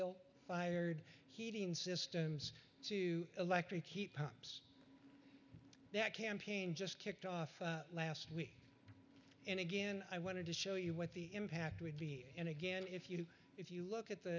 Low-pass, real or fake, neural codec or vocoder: 7.2 kHz; real; none